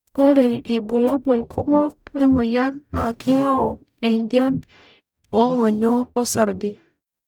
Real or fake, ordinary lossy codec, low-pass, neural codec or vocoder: fake; none; none; codec, 44.1 kHz, 0.9 kbps, DAC